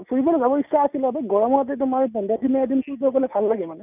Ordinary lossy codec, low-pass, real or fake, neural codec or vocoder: none; 3.6 kHz; real; none